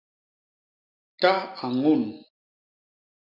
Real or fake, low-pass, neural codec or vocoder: real; 5.4 kHz; none